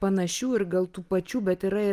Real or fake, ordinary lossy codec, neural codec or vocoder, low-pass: real; Opus, 32 kbps; none; 14.4 kHz